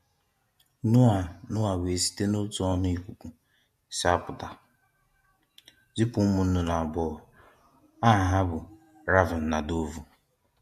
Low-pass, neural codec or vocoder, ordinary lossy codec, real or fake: 14.4 kHz; vocoder, 48 kHz, 128 mel bands, Vocos; MP3, 64 kbps; fake